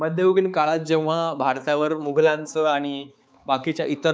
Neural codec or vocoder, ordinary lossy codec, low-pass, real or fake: codec, 16 kHz, 4 kbps, X-Codec, HuBERT features, trained on balanced general audio; none; none; fake